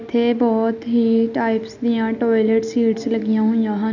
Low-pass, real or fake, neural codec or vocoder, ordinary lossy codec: 7.2 kHz; real; none; none